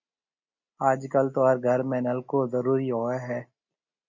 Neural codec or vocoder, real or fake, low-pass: none; real; 7.2 kHz